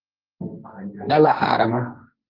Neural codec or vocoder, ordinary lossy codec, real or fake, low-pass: codec, 16 kHz, 1.1 kbps, Voila-Tokenizer; Opus, 24 kbps; fake; 5.4 kHz